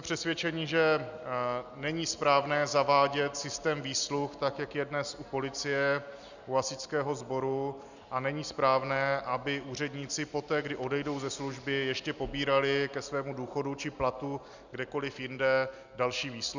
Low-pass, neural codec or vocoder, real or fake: 7.2 kHz; none; real